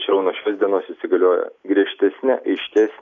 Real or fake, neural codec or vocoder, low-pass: real; none; 7.2 kHz